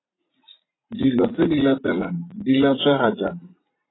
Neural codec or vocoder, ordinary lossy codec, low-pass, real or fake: vocoder, 44.1 kHz, 80 mel bands, Vocos; AAC, 16 kbps; 7.2 kHz; fake